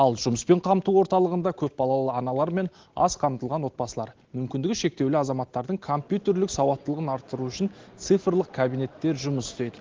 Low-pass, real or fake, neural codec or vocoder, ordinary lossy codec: 7.2 kHz; real; none; Opus, 16 kbps